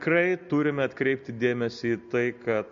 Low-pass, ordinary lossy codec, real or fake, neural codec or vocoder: 7.2 kHz; MP3, 48 kbps; real; none